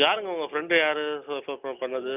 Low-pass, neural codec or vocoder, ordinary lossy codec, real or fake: 3.6 kHz; none; none; real